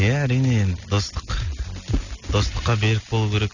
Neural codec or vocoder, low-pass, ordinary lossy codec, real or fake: none; 7.2 kHz; none; real